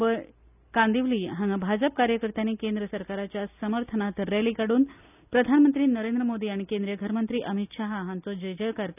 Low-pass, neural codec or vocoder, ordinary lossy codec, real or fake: 3.6 kHz; none; none; real